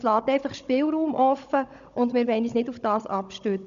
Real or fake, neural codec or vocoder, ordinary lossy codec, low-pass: fake; codec, 16 kHz, 16 kbps, FunCodec, trained on Chinese and English, 50 frames a second; MP3, 96 kbps; 7.2 kHz